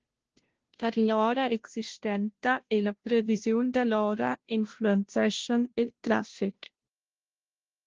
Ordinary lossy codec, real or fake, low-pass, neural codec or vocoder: Opus, 16 kbps; fake; 7.2 kHz; codec, 16 kHz, 0.5 kbps, FunCodec, trained on Chinese and English, 25 frames a second